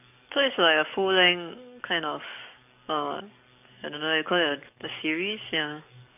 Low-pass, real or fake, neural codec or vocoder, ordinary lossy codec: 3.6 kHz; fake; codec, 44.1 kHz, 7.8 kbps, DAC; none